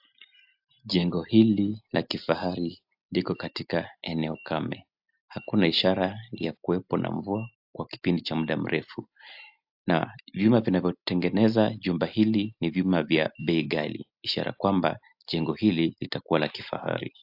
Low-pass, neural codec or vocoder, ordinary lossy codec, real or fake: 5.4 kHz; none; AAC, 48 kbps; real